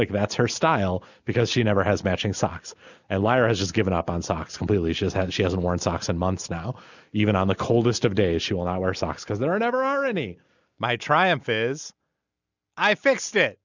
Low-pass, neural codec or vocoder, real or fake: 7.2 kHz; none; real